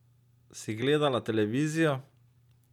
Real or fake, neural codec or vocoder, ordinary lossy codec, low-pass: real; none; none; 19.8 kHz